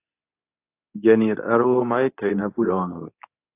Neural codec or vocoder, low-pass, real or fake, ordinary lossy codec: codec, 24 kHz, 0.9 kbps, WavTokenizer, medium speech release version 2; 3.6 kHz; fake; AAC, 24 kbps